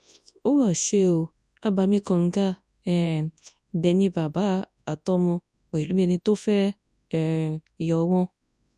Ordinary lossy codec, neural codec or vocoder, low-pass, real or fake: none; codec, 24 kHz, 0.9 kbps, WavTokenizer, large speech release; none; fake